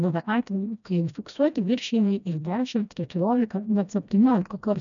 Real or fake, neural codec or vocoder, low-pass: fake; codec, 16 kHz, 1 kbps, FreqCodec, smaller model; 7.2 kHz